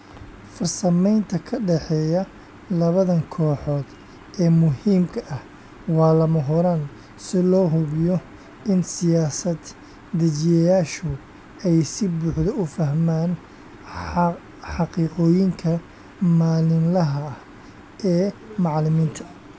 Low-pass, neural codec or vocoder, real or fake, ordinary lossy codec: none; none; real; none